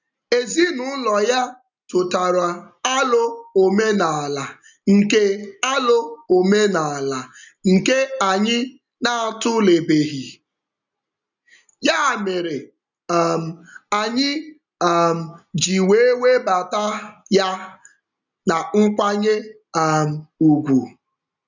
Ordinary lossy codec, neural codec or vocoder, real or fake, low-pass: none; none; real; 7.2 kHz